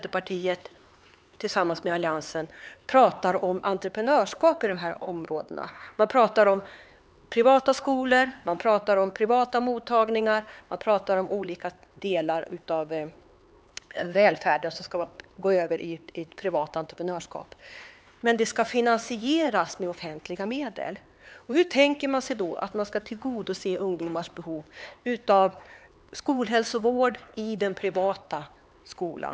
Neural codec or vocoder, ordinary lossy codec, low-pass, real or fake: codec, 16 kHz, 4 kbps, X-Codec, HuBERT features, trained on LibriSpeech; none; none; fake